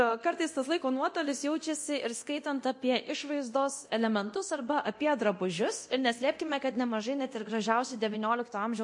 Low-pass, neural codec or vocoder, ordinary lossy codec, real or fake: 10.8 kHz; codec, 24 kHz, 0.9 kbps, DualCodec; MP3, 48 kbps; fake